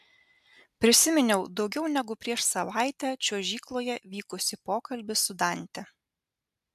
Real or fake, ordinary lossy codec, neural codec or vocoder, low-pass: real; MP3, 96 kbps; none; 14.4 kHz